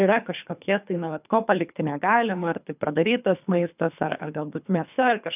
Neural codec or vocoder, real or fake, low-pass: codec, 24 kHz, 3 kbps, HILCodec; fake; 3.6 kHz